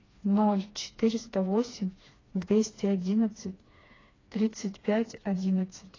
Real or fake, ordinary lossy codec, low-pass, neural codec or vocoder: fake; AAC, 32 kbps; 7.2 kHz; codec, 16 kHz, 2 kbps, FreqCodec, smaller model